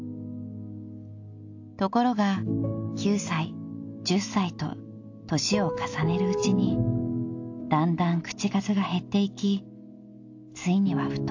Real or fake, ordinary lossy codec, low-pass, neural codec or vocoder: real; AAC, 48 kbps; 7.2 kHz; none